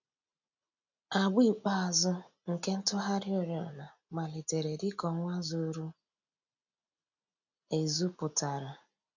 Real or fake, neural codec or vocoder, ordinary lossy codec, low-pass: real; none; none; 7.2 kHz